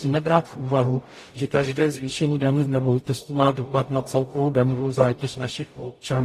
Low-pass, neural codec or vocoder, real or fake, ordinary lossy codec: 14.4 kHz; codec, 44.1 kHz, 0.9 kbps, DAC; fake; AAC, 48 kbps